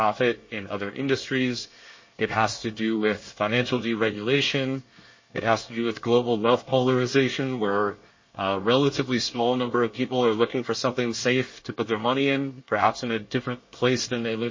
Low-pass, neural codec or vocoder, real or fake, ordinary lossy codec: 7.2 kHz; codec, 24 kHz, 1 kbps, SNAC; fake; MP3, 32 kbps